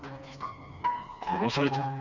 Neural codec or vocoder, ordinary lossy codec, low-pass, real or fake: codec, 16 kHz, 2 kbps, FreqCodec, smaller model; none; 7.2 kHz; fake